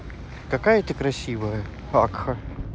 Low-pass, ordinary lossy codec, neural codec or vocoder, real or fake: none; none; none; real